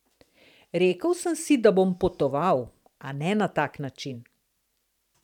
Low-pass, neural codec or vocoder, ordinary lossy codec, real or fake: 19.8 kHz; vocoder, 44.1 kHz, 128 mel bands every 512 samples, BigVGAN v2; none; fake